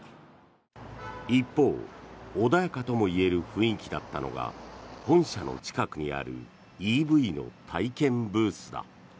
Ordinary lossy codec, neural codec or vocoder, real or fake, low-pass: none; none; real; none